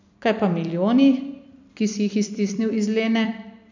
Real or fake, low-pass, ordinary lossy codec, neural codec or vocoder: fake; 7.2 kHz; none; vocoder, 44.1 kHz, 128 mel bands every 256 samples, BigVGAN v2